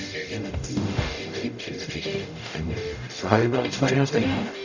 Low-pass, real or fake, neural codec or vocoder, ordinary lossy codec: 7.2 kHz; fake; codec, 44.1 kHz, 0.9 kbps, DAC; none